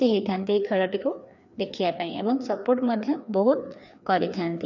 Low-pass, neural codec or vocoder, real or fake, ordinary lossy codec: 7.2 kHz; codec, 16 kHz, 2 kbps, FreqCodec, larger model; fake; none